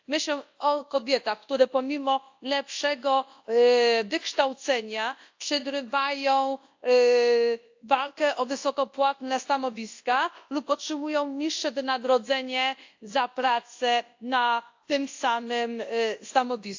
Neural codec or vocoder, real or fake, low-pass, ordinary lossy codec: codec, 24 kHz, 0.9 kbps, WavTokenizer, large speech release; fake; 7.2 kHz; AAC, 48 kbps